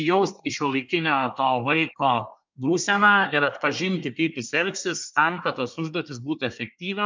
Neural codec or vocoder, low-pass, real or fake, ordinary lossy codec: codec, 24 kHz, 1 kbps, SNAC; 7.2 kHz; fake; MP3, 64 kbps